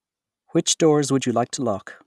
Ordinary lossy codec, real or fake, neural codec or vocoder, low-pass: none; real; none; none